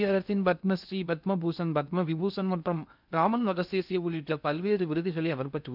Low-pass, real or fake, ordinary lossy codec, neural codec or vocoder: 5.4 kHz; fake; none; codec, 16 kHz in and 24 kHz out, 0.6 kbps, FocalCodec, streaming, 2048 codes